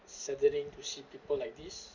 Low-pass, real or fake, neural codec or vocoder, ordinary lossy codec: 7.2 kHz; fake; vocoder, 44.1 kHz, 128 mel bands every 256 samples, BigVGAN v2; none